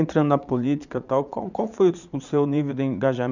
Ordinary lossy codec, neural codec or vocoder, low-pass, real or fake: none; vocoder, 22.05 kHz, 80 mel bands, Vocos; 7.2 kHz; fake